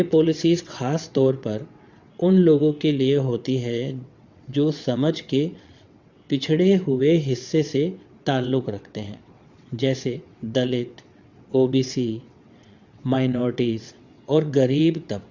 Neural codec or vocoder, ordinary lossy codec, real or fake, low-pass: vocoder, 22.05 kHz, 80 mel bands, WaveNeXt; Opus, 64 kbps; fake; 7.2 kHz